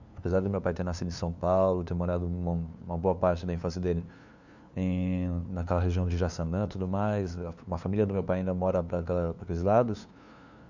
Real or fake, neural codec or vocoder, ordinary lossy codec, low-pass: fake; codec, 16 kHz, 2 kbps, FunCodec, trained on LibriTTS, 25 frames a second; none; 7.2 kHz